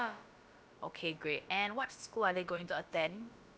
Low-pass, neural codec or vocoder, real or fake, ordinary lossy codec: none; codec, 16 kHz, about 1 kbps, DyCAST, with the encoder's durations; fake; none